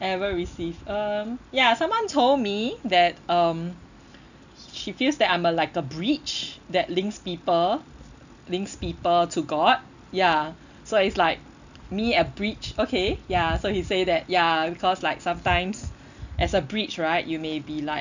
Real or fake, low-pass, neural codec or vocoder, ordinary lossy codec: real; 7.2 kHz; none; none